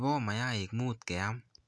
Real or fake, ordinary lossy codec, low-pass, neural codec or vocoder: real; none; none; none